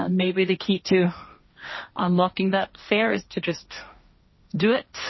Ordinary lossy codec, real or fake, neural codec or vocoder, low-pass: MP3, 24 kbps; fake; codec, 16 kHz, 1.1 kbps, Voila-Tokenizer; 7.2 kHz